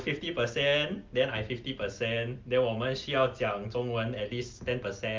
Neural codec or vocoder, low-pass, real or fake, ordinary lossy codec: none; 7.2 kHz; real; Opus, 24 kbps